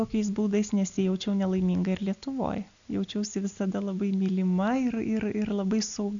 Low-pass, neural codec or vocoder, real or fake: 7.2 kHz; none; real